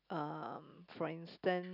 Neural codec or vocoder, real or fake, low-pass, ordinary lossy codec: none; real; 5.4 kHz; none